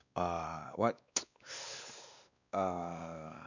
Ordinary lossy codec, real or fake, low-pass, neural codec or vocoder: none; fake; 7.2 kHz; codec, 16 kHz, 2 kbps, X-Codec, WavLM features, trained on Multilingual LibriSpeech